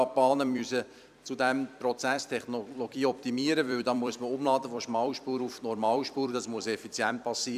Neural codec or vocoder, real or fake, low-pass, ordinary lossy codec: vocoder, 44.1 kHz, 128 mel bands every 256 samples, BigVGAN v2; fake; 14.4 kHz; none